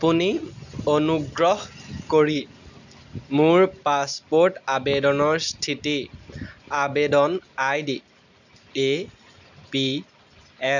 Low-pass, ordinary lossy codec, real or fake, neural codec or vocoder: 7.2 kHz; none; real; none